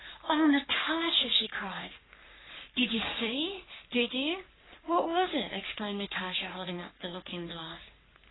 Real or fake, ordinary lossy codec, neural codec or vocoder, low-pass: fake; AAC, 16 kbps; codec, 44.1 kHz, 3.4 kbps, Pupu-Codec; 7.2 kHz